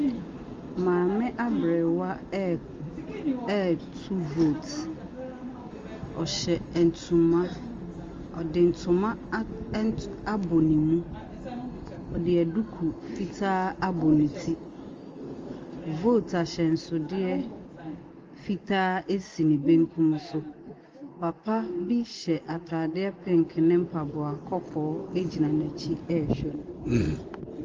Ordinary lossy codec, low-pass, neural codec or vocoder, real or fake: Opus, 32 kbps; 7.2 kHz; none; real